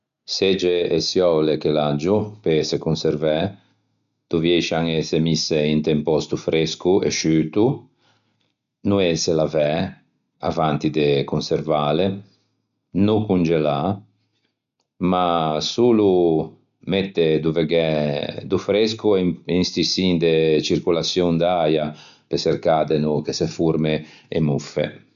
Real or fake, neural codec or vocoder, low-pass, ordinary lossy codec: real; none; 7.2 kHz; none